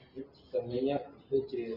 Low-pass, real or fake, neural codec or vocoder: 5.4 kHz; real; none